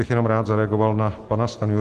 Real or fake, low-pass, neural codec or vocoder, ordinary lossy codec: real; 14.4 kHz; none; Opus, 16 kbps